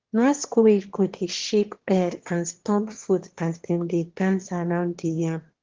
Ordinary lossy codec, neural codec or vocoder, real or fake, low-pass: Opus, 16 kbps; autoencoder, 22.05 kHz, a latent of 192 numbers a frame, VITS, trained on one speaker; fake; 7.2 kHz